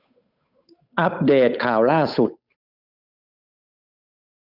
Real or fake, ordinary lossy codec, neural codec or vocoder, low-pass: fake; none; codec, 16 kHz, 8 kbps, FunCodec, trained on Chinese and English, 25 frames a second; 5.4 kHz